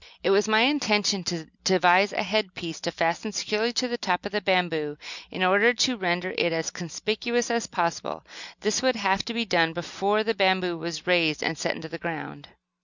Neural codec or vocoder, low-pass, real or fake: none; 7.2 kHz; real